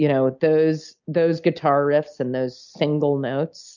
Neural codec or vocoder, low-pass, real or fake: none; 7.2 kHz; real